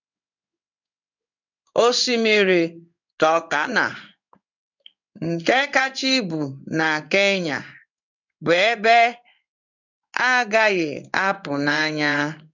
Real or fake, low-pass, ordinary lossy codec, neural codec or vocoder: fake; 7.2 kHz; none; codec, 16 kHz in and 24 kHz out, 1 kbps, XY-Tokenizer